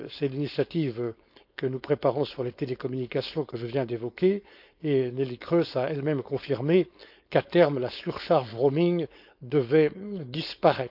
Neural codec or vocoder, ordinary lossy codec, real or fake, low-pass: codec, 16 kHz, 4.8 kbps, FACodec; none; fake; 5.4 kHz